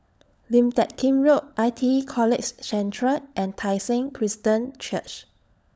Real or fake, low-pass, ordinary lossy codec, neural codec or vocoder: fake; none; none; codec, 16 kHz, 16 kbps, FunCodec, trained on LibriTTS, 50 frames a second